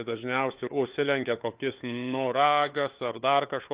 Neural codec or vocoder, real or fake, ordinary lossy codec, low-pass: codec, 16 kHz, 4 kbps, FunCodec, trained on LibriTTS, 50 frames a second; fake; Opus, 32 kbps; 3.6 kHz